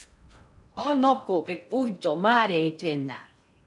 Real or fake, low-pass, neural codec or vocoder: fake; 10.8 kHz; codec, 16 kHz in and 24 kHz out, 0.6 kbps, FocalCodec, streaming, 4096 codes